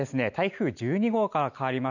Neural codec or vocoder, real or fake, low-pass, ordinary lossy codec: none; real; 7.2 kHz; none